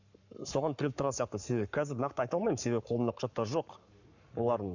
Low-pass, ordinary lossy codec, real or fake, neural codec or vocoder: 7.2 kHz; none; fake; codec, 44.1 kHz, 7.8 kbps, Pupu-Codec